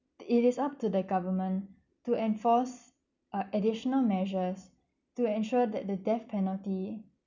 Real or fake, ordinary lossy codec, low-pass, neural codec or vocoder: real; none; 7.2 kHz; none